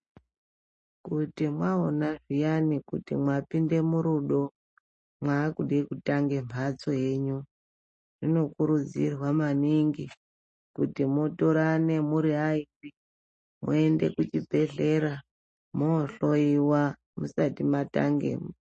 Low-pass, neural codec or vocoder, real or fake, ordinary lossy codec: 10.8 kHz; none; real; MP3, 32 kbps